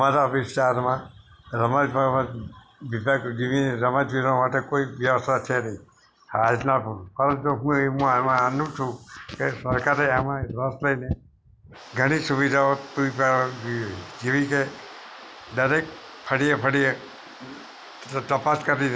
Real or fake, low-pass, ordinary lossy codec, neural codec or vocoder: real; none; none; none